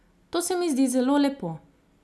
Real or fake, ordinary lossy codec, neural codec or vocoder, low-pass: real; none; none; none